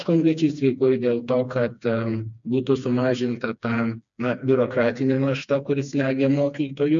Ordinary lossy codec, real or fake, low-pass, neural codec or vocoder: MP3, 64 kbps; fake; 7.2 kHz; codec, 16 kHz, 2 kbps, FreqCodec, smaller model